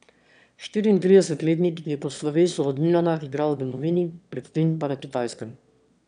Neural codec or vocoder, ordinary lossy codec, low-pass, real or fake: autoencoder, 22.05 kHz, a latent of 192 numbers a frame, VITS, trained on one speaker; none; 9.9 kHz; fake